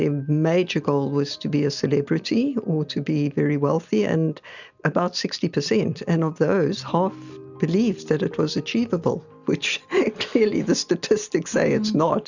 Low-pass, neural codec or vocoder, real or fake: 7.2 kHz; none; real